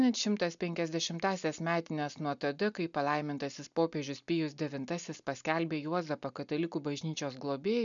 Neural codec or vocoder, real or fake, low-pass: none; real; 7.2 kHz